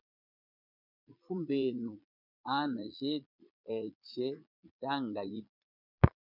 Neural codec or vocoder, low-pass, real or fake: vocoder, 22.05 kHz, 80 mel bands, Vocos; 5.4 kHz; fake